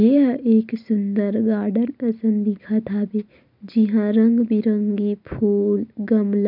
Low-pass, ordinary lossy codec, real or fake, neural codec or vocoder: 5.4 kHz; none; real; none